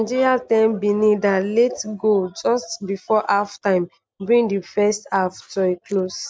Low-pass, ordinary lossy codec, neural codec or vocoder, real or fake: none; none; none; real